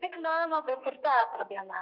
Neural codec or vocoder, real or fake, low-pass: codec, 32 kHz, 1.9 kbps, SNAC; fake; 5.4 kHz